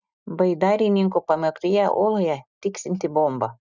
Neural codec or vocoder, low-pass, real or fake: none; 7.2 kHz; real